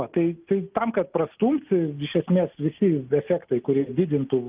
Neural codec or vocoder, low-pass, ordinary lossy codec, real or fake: none; 3.6 kHz; Opus, 32 kbps; real